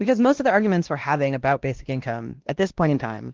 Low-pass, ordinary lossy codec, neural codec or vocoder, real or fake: 7.2 kHz; Opus, 16 kbps; codec, 16 kHz, 1 kbps, X-Codec, WavLM features, trained on Multilingual LibriSpeech; fake